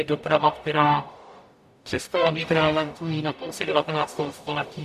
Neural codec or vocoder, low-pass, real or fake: codec, 44.1 kHz, 0.9 kbps, DAC; 14.4 kHz; fake